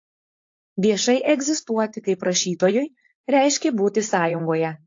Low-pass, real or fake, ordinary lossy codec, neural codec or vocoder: 7.2 kHz; fake; AAC, 48 kbps; codec, 16 kHz, 4.8 kbps, FACodec